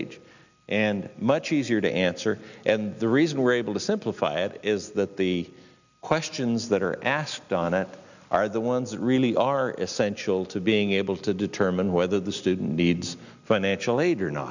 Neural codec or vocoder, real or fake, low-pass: none; real; 7.2 kHz